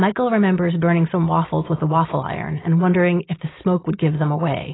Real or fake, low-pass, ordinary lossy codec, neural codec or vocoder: real; 7.2 kHz; AAC, 16 kbps; none